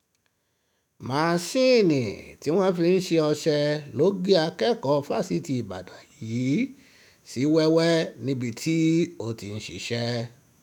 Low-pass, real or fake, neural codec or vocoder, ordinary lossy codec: 19.8 kHz; fake; autoencoder, 48 kHz, 128 numbers a frame, DAC-VAE, trained on Japanese speech; none